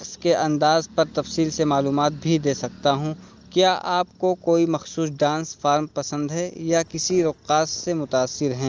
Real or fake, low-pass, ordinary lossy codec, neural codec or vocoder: real; 7.2 kHz; Opus, 24 kbps; none